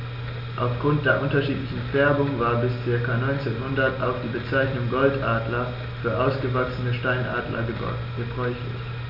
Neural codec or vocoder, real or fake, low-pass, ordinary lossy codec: none; real; 5.4 kHz; none